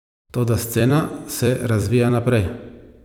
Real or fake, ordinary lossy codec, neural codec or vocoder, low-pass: fake; none; vocoder, 44.1 kHz, 128 mel bands every 256 samples, BigVGAN v2; none